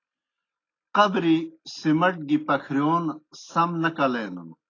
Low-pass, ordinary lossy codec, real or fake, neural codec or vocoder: 7.2 kHz; AAC, 32 kbps; real; none